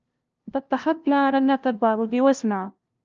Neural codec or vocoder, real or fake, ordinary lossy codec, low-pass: codec, 16 kHz, 0.5 kbps, FunCodec, trained on LibriTTS, 25 frames a second; fake; Opus, 24 kbps; 7.2 kHz